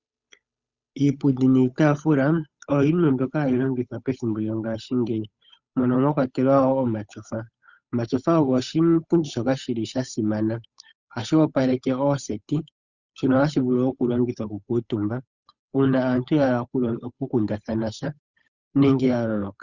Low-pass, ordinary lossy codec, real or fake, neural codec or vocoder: 7.2 kHz; Opus, 64 kbps; fake; codec, 16 kHz, 8 kbps, FunCodec, trained on Chinese and English, 25 frames a second